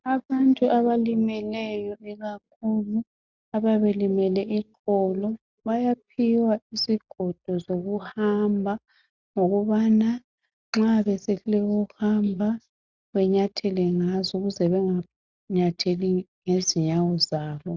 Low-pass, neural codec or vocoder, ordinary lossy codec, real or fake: 7.2 kHz; none; Opus, 64 kbps; real